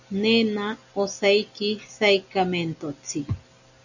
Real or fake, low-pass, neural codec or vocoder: real; 7.2 kHz; none